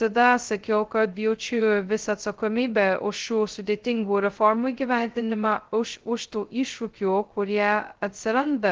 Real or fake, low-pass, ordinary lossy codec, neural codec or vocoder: fake; 7.2 kHz; Opus, 16 kbps; codec, 16 kHz, 0.2 kbps, FocalCodec